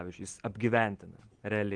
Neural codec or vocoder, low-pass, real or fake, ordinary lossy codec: none; 9.9 kHz; real; Opus, 16 kbps